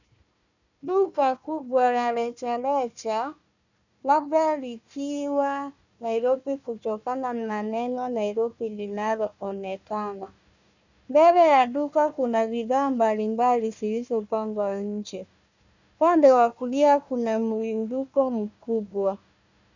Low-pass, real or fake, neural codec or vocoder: 7.2 kHz; fake; codec, 16 kHz, 1 kbps, FunCodec, trained on Chinese and English, 50 frames a second